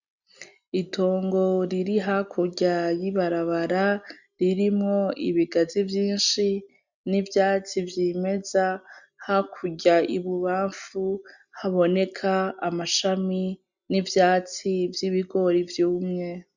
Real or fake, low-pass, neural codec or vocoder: real; 7.2 kHz; none